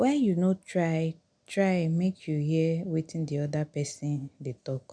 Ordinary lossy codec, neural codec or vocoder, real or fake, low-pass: none; none; real; 9.9 kHz